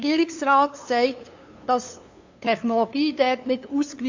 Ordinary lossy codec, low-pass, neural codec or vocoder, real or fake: AAC, 48 kbps; 7.2 kHz; codec, 16 kHz, 2 kbps, FunCodec, trained on LibriTTS, 25 frames a second; fake